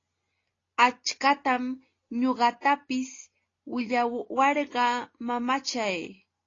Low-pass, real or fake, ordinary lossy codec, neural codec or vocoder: 7.2 kHz; real; AAC, 32 kbps; none